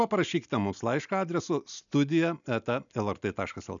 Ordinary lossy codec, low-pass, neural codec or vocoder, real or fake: MP3, 96 kbps; 7.2 kHz; none; real